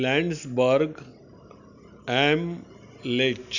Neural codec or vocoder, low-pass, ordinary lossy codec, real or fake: none; 7.2 kHz; none; real